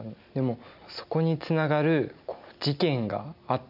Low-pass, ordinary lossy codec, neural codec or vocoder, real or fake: 5.4 kHz; none; none; real